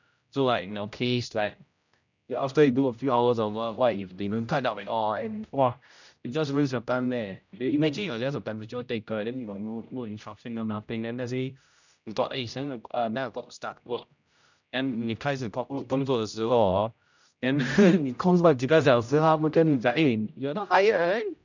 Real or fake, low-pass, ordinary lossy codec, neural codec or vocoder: fake; 7.2 kHz; none; codec, 16 kHz, 0.5 kbps, X-Codec, HuBERT features, trained on general audio